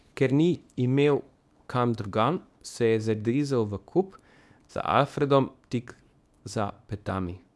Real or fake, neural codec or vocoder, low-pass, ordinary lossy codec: fake; codec, 24 kHz, 0.9 kbps, WavTokenizer, small release; none; none